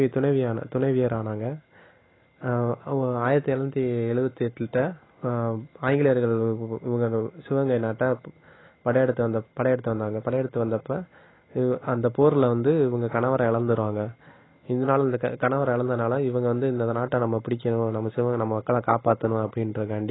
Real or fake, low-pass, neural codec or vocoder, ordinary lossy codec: real; 7.2 kHz; none; AAC, 16 kbps